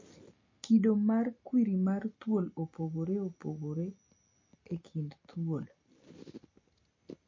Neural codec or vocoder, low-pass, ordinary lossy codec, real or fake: none; 7.2 kHz; MP3, 32 kbps; real